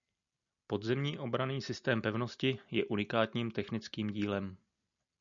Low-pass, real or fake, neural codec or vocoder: 7.2 kHz; real; none